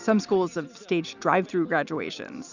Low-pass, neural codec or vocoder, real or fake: 7.2 kHz; none; real